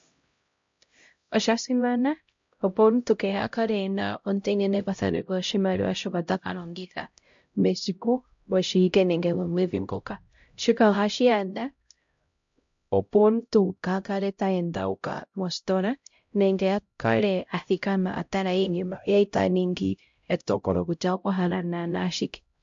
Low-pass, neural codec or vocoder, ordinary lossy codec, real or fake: 7.2 kHz; codec, 16 kHz, 0.5 kbps, X-Codec, HuBERT features, trained on LibriSpeech; MP3, 48 kbps; fake